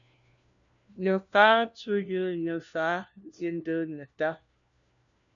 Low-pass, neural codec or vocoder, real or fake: 7.2 kHz; codec, 16 kHz, 1 kbps, FunCodec, trained on LibriTTS, 50 frames a second; fake